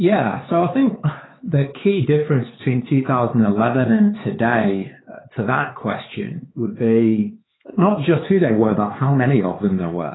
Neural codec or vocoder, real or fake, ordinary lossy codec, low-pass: codec, 16 kHz, 4 kbps, X-Codec, HuBERT features, trained on LibriSpeech; fake; AAC, 16 kbps; 7.2 kHz